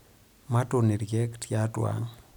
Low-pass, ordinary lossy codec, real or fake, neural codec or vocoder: none; none; real; none